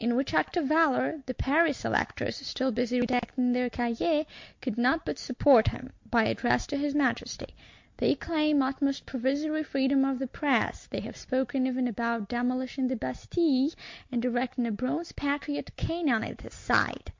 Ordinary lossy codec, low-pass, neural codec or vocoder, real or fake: MP3, 48 kbps; 7.2 kHz; none; real